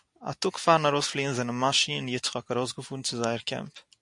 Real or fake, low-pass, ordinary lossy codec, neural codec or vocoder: real; 10.8 kHz; AAC, 64 kbps; none